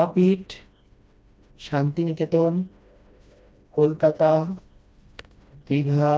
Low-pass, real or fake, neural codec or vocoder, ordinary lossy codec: none; fake; codec, 16 kHz, 1 kbps, FreqCodec, smaller model; none